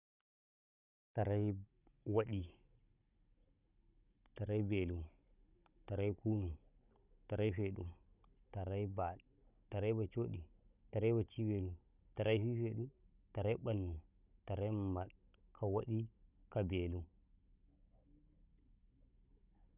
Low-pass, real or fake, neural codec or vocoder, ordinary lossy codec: 3.6 kHz; fake; codec, 16 kHz, 16 kbps, FunCodec, trained on Chinese and English, 50 frames a second; none